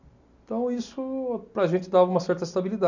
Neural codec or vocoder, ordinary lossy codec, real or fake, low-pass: none; none; real; 7.2 kHz